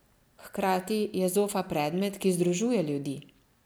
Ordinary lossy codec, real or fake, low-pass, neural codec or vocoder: none; real; none; none